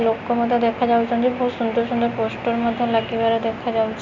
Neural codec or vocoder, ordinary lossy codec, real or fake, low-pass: none; none; real; 7.2 kHz